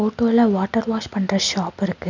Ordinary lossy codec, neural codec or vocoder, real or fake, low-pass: none; none; real; 7.2 kHz